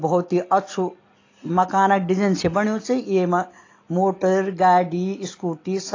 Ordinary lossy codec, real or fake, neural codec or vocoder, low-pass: AAC, 32 kbps; real; none; 7.2 kHz